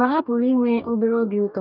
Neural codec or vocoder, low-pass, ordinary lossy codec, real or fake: codec, 44.1 kHz, 2.6 kbps, DAC; 5.4 kHz; none; fake